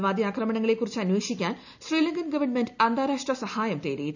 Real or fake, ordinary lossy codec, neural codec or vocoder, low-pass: real; none; none; 7.2 kHz